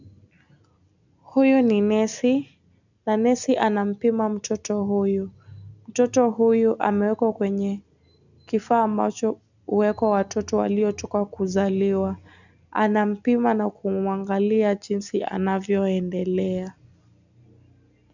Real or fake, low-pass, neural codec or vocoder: real; 7.2 kHz; none